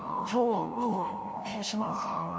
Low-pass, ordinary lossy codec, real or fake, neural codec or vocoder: none; none; fake; codec, 16 kHz, 0.5 kbps, FunCodec, trained on LibriTTS, 25 frames a second